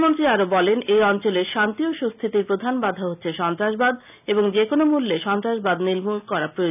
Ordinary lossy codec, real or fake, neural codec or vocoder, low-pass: none; real; none; 3.6 kHz